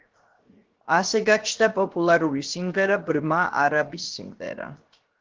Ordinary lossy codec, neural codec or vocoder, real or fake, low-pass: Opus, 16 kbps; codec, 16 kHz, 0.7 kbps, FocalCodec; fake; 7.2 kHz